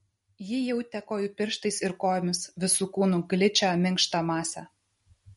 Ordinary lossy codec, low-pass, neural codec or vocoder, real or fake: MP3, 48 kbps; 19.8 kHz; none; real